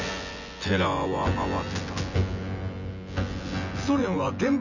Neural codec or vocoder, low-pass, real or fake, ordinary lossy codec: vocoder, 24 kHz, 100 mel bands, Vocos; 7.2 kHz; fake; none